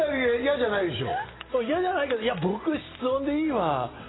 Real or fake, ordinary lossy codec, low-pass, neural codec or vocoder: real; AAC, 16 kbps; 7.2 kHz; none